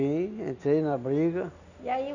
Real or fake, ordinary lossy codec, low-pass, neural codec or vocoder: real; none; 7.2 kHz; none